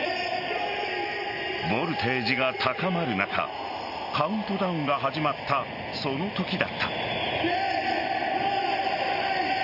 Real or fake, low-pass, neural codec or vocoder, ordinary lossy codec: real; 5.4 kHz; none; none